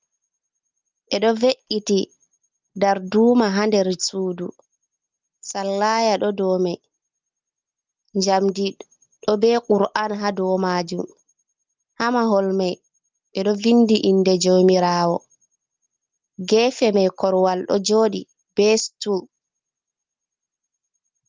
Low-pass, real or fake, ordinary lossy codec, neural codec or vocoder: 7.2 kHz; real; Opus, 24 kbps; none